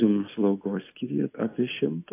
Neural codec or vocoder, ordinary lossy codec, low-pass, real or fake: none; AAC, 24 kbps; 3.6 kHz; real